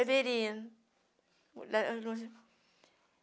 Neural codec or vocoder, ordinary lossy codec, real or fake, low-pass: none; none; real; none